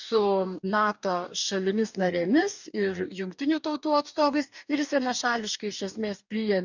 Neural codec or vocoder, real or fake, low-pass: codec, 44.1 kHz, 2.6 kbps, DAC; fake; 7.2 kHz